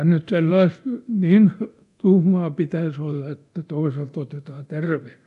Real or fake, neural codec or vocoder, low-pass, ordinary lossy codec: fake; codec, 24 kHz, 0.9 kbps, DualCodec; 10.8 kHz; AAC, 96 kbps